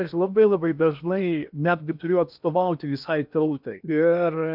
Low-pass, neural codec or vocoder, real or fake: 5.4 kHz; codec, 16 kHz in and 24 kHz out, 0.8 kbps, FocalCodec, streaming, 65536 codes; fake